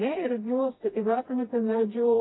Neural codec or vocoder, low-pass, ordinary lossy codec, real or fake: codec, 16 kHz, 1 kbps, FreqCodec, smaller model; 7.2 kHz; AAC, 16 kbps; fake